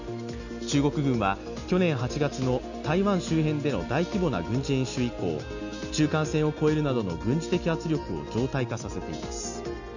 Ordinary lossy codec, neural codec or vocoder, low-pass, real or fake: none; none; 7.2 kHz; real